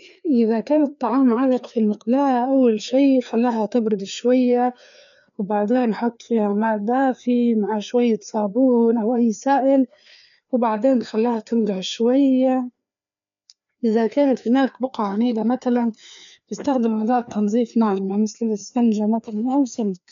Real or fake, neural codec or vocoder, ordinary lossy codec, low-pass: fake; codec, 16 kHz, 2 kbps, FreqCodec, larger model; none; 7.2 kHz